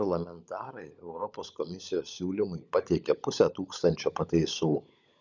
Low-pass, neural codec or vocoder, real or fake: 7.2 kHz; codec, 16 kHz, 16 kbps, FunCodec, trained on LibriTTS, 50 frames a second; fake